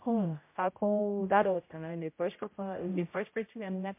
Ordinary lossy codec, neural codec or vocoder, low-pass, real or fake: MP3, 24 kbps; codec, 16 kHz, 0.5 kbps, X-Codec, HuBERT features, trained on general audio; 3.6 kHz; fake